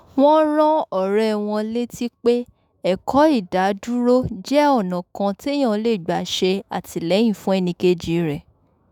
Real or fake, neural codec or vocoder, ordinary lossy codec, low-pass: fake; autoencoder, 48 kHz, 128 numbers a frame, DAC-VAE, trained on Japanese speech; none; 19.8 kHz